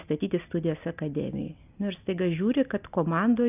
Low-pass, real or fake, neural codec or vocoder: 3.6 kHz; real; none